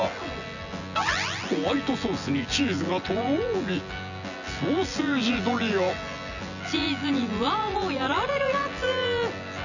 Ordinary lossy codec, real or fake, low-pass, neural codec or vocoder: none; fake; 7.2 kHz; vocoder, 24 kHz, 100 mel bands, Vocos